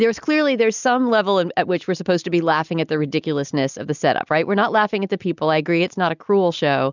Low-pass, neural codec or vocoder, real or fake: 7.2 kHz; none; real